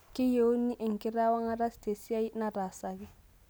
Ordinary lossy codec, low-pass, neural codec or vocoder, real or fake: none; none; none; real